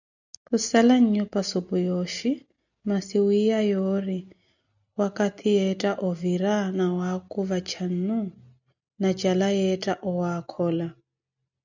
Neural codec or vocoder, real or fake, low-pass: none; real; 7.2 kHz